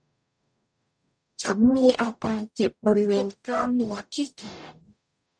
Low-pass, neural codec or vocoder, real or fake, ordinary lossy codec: 9.9 kHz; codec, 44.1 kHz, 0.9 kbps, DAC; fake; none